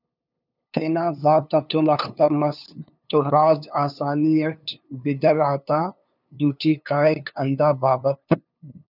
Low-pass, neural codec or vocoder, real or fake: 5.4 kHz; codec, 16 kHz, 2 kbps, FunCodec, trained on LibriTTS, 25 frames a second; fake